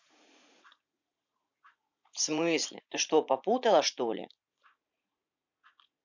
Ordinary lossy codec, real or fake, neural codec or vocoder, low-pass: none; fake; vocoder, 44.1 kHz, 80 mel bands, Vocos; 7.2 kHz